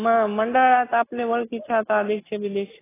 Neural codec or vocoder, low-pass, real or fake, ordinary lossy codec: none; 3.6 kHz; real; AAC, 16 kbps